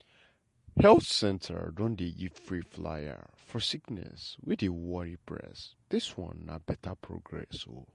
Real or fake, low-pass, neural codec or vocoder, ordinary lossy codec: fake; 14.4 kHz; vocoder, 48 kHz, 128 mel bands, Vocos; MP3, 48 kbps